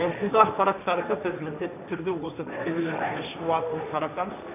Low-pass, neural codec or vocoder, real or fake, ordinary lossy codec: 3.6 kHz; codec, 16 kHz, 1.1 kbps, Voila-Tokenizer; fake; none